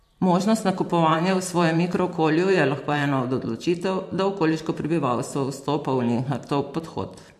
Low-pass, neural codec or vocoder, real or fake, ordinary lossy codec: 14.4 kHz; vocoder, 44.1 kHz, 128 mel bands every 512 samples, BigVGAN v2; fake; MP3, 64 kbps